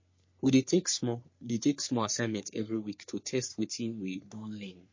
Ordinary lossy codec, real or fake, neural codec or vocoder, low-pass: MP3, 32 kbps; fake; codec, 44.1 kHz, 3.4 kbps, Pupu-Codec; 7.2 kHz